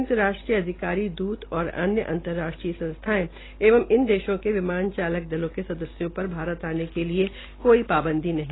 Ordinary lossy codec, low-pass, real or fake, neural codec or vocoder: AAC, 16 kbps; 7.2 kHz; real; none